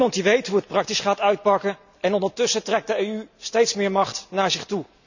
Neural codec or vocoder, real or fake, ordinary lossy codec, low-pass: none; real; none; 7.2 kHz